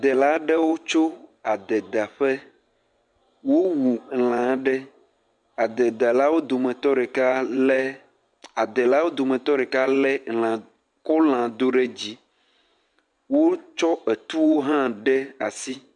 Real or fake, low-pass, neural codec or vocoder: fake; 10.8 kHz; vocoder, 24 kHz, 100 mel bands, Vocos